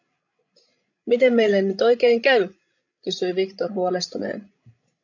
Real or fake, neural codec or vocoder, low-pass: fake; codec, 16 kHz, 16 kbps, FreqCodec, larger model; 7.2 kHz